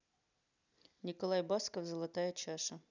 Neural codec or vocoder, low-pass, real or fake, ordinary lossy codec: none; 7.2 kHz; real; none